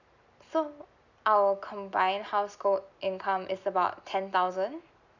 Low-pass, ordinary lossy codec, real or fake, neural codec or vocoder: 7.2 kHz; none; real; none